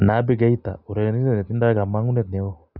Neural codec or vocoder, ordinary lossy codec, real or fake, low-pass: none; none; real; 5.4 kHz